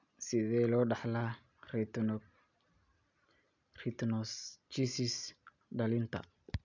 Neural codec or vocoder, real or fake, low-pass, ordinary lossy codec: none; real; 7.2 kHz; none